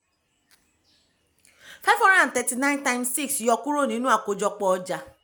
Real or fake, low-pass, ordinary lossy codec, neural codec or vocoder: real; none; none; none